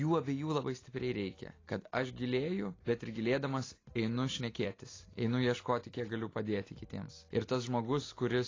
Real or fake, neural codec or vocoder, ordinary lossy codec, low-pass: real; none; AAC, 32 kbps; 7.2 kHz